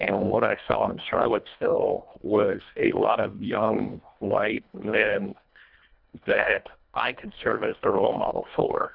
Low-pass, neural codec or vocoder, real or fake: 5.4 kHz; codec, 24 kHz, 1.5 kbps, HILCodec; fake